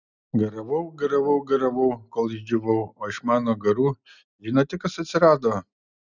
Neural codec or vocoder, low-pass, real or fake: none; 7.2 kHz; real